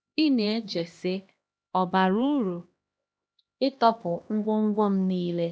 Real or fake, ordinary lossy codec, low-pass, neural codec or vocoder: fake; none; none; codec, 16 kHz, 1 kbps, X-Codec, HuBERT features, trained on LibriSpeech